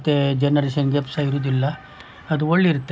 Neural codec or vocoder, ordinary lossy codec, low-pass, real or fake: none; none; none; real